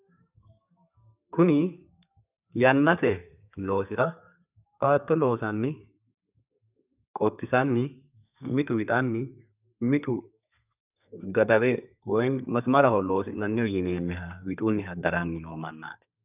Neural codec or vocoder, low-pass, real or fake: codec, 32 kHz, 1.9 kbps, SNAC; 3.6 kHz; fake